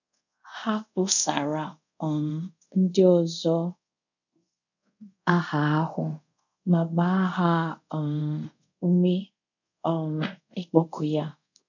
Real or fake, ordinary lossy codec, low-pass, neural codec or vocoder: fake; none; 7.2 kHz; codec, 24 kHz, 0.5 kbps, DualCodec